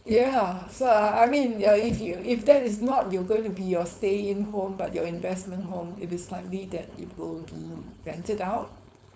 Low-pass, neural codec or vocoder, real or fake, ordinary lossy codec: none; codec, 16 kHz, 4.8 kbps, FACodec; fake; none